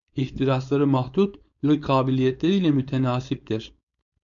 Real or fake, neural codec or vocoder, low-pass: fake; codec, 16 kHz, 4.8 kbps, FACodec; 7.2 kHz